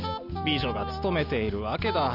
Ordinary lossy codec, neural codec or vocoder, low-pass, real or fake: none; none; 5.4 kHz; real